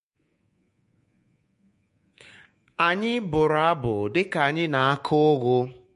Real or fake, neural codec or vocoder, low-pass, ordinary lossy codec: fake; codec, 24 kHz, 3.1 kbps, DualCodec; 10.8 kHz; MP3, 48 kbps